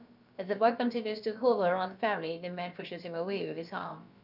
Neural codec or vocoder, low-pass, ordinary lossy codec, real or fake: codec, 16 kHz, about 1 kbps, DyCAST, with the encoder's durations; 5.4 kHz; none; fake